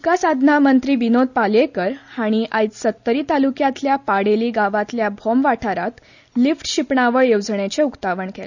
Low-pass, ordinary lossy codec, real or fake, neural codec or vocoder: 7.2 kHz; none; real; none